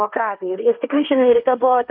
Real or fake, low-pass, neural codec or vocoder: fake; 5.4 kHz; codec, 16 kHz, 1.1 kbps, Voila-Tokenizer